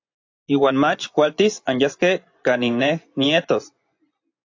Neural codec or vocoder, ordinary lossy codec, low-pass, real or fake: vocoder, 24 kHz, 100 mel bands, Vocos; AAC, 48 kbps; 7.2 kHz; fake